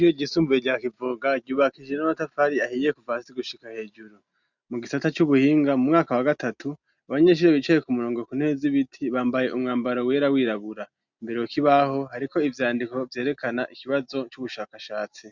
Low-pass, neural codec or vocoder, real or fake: 7.2 kHz; none; real